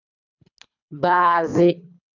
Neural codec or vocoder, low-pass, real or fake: codec, 24 kHz, 3 kbps, HILCodec; 7.2 kHz; fake